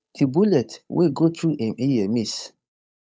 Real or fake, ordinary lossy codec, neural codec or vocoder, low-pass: fake; none; codec, 16 kHz, 8 kbps, FunCodec, trained on Chinese and English, 25 frames a second; none